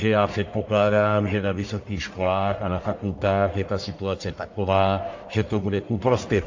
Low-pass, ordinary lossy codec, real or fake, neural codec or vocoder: 7.2 kHz; AAC, 48 kbps; fake; codec, 44.1 kHz, 1.7 kbps, Pupu-Codec